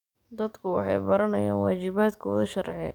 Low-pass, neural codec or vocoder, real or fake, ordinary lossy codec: 19.8 kHz; codec, 44.1 kHz, 7.8 kbps, DAC; fake; none